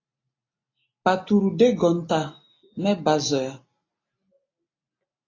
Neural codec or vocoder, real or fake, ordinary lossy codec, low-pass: none; real; AAC, 32 kbps; 7.2 kHz